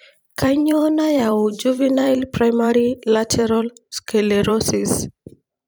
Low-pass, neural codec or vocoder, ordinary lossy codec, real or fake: none; vocoder, 44.1 kHz, 128 mel bands every 512 samples, BigVGAN v2; none; fake